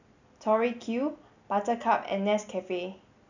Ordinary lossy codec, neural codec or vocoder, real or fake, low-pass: none; none; real; 7.2 kHz